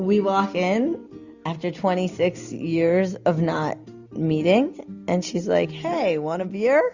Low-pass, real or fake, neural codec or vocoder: 7.2 kHz; real; none